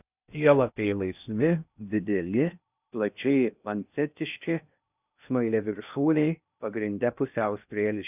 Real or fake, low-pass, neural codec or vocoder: fake; 3.6 kHz; codec, 16 kHz in and 24 kHz out, 0.6 kbps, FocalCodec, streaming, 2048 codes